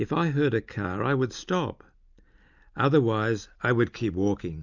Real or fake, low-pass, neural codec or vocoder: real; 7.2 kHz; none